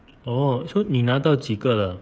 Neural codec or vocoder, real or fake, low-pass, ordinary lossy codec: codec, 16 kHz, 8 kbps, FreqCodec, smaller model; fake; none; none